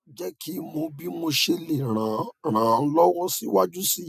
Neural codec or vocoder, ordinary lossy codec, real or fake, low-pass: none; none; real; 14.4 kHz